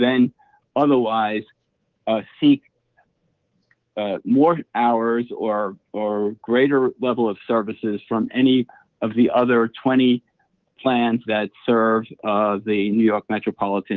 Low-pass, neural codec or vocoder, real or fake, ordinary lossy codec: 7.2 kHz; codec, 24 kHz, 3.1 kbps, DualCodec; fake; Opus, 16 kbps